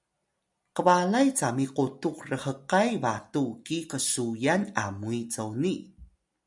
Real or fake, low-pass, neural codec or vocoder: real; 10.8 kHz; none